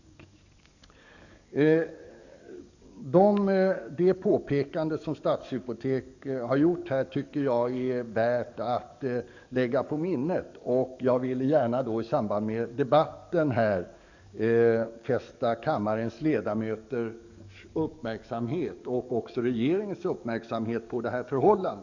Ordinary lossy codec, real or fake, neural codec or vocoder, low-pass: none; fake; codec, 44.1 kHz, 7.8 kbps, DAC; 7.2 kHz